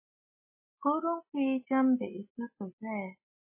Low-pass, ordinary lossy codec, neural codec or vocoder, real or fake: 3.6 kHz; MP3, 16 kbps; none; real